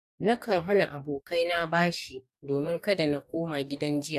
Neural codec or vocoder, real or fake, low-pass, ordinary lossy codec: codec, 44.1 kHz, 2.6 kbps, DAC; fake; 14.4 kHz; none